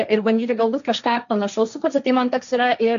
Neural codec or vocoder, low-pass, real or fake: codec, 16 kHz, 1.1 kbps, Voila-Tokenizer; 7.2 kHz; fake